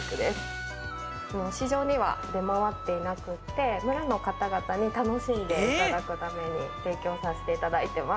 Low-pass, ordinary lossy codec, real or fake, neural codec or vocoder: none; none; real; none